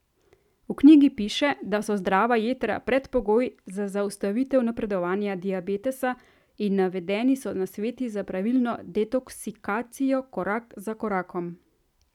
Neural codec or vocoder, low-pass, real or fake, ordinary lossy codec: none; 19.8 kHz; real; none